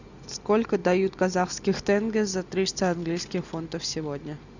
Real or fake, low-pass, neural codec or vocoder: real; 7.2 kHz; none